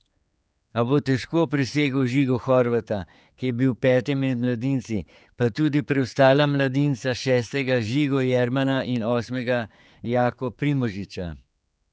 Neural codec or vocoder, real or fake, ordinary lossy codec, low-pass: codec, 16 kHz, 4 kbps, X-Codec, HuBERT features, trained on general audio; fake; none; none